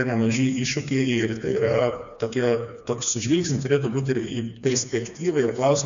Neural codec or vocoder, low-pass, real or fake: codec, 16 kHz, 2 kbps, FreqCodec, smaller model; 7.2 kHz; fake